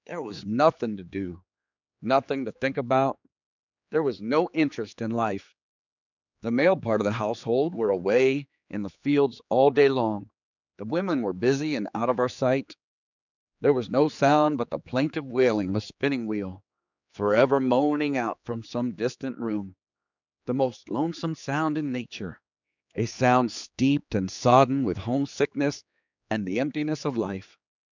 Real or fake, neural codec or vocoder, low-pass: fake; codec, 16 kHz, 4 kbps, X-Codec, HuBERT features, trained on general audio; 7.2 kHz